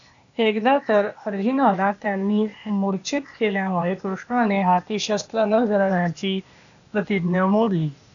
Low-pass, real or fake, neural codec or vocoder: 7.2 kHz; fake; codec, 16 kHz, 0.8 kbps, ZipCodec